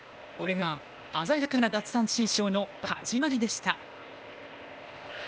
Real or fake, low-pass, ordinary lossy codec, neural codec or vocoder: fake; none; none; codec, 16 kHz, 0.8 kbps, ZipCodec